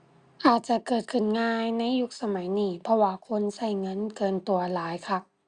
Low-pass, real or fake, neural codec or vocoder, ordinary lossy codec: 9.9 kHz; real; none; Opus, 64 kbps